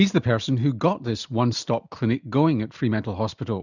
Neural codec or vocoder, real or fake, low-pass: none; real; 7.2 kHz